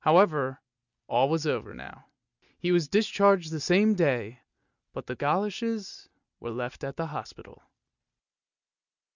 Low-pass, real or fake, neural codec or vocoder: 7.2 kHz; real; none